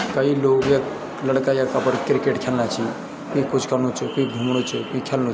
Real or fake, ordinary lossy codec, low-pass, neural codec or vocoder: real; none; none; none